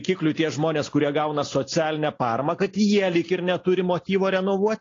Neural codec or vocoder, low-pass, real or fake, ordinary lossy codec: none; 7.2 kHz; real; AAC, 32 kbps